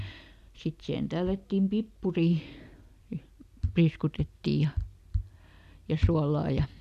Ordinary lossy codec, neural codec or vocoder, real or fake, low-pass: none; none; real; 14.4 kHz